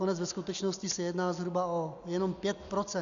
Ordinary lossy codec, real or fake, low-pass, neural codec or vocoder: MP3, 96 kbps; real; 7.2 kHz; none